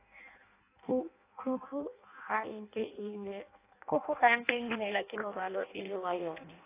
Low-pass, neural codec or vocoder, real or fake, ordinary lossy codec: 3.6 kHz; codec, 16 kHz in and 24 kHz out, 0.6 kbps, FireRedTTS-2 codec; fake; AAC, 24 kbps